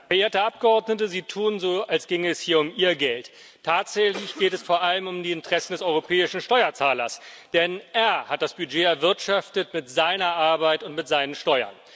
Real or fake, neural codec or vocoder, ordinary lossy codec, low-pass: real; none; none; none